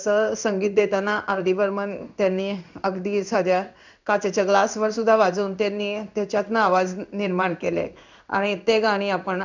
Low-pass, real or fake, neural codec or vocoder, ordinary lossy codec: 7.2 kHz; fake; codec, 16 kHz in and 24 kHz out, 1 kbps, XY-Tokenizer; none